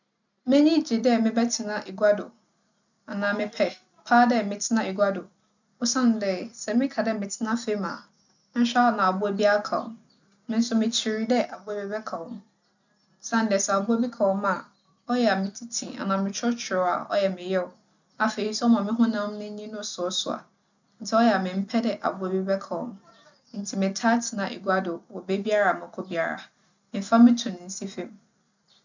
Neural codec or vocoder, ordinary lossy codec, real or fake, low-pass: none; none; real; 7.2 kHz